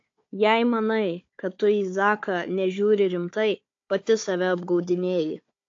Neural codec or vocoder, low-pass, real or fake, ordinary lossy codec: codec, 16 kHz, 4 kbps, FunCodec, trained on Chinese and English, 50 frames a second; 7.2 kHz; fake; AAC, 48 kbps